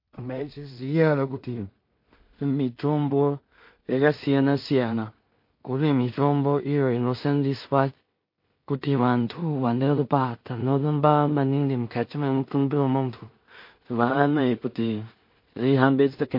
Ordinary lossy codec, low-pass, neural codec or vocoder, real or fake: MP3, 32 kbps; 5.4 kHz; codec, 16 kHz in and 24 kHz out, 0.4 kbps, LongCat-Audio-Codec, two codebook decoder; fake